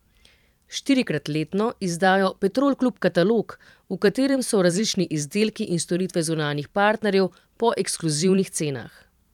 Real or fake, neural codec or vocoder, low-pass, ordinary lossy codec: fake; vocoder, 44.1 kHz, 128 mel bands every 512 samples, BigVGAN v2; 19.8 kHz; none